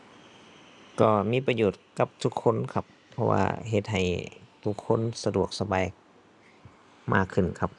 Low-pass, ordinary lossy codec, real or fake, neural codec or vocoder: 10.8 kHz; none; real; none